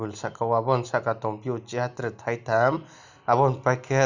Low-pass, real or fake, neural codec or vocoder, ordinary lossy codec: 7.2 kHz; real; none; none